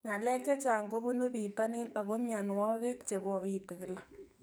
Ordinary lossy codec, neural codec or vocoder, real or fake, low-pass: none; codec, 44.1 kHz, 2.6 kbps, SNAC; fake; none